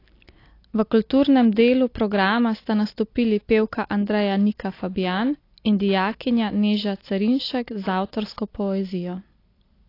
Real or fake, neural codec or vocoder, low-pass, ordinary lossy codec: real; none; 5.4 kHz; AAC, 32 kbps